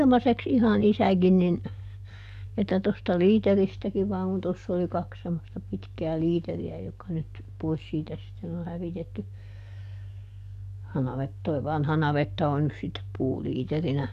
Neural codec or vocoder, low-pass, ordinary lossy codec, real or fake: codec, 44.1 kHz, 7.8 kbps, DAC; 14.4 kHz; none; fake